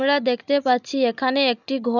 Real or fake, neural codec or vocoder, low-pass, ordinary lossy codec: real; none; 7.2 kHz; none